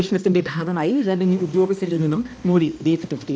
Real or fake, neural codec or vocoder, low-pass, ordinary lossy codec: fake; codec, 16 kHz, 1 kbps, X-Codec, HuBERT features, trained on balanced general audio; none; none